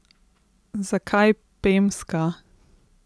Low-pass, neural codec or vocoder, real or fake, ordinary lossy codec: none; none; real; none